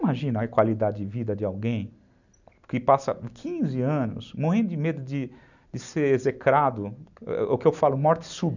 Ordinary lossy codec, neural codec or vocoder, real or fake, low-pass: none; none; real; 7.2 kHz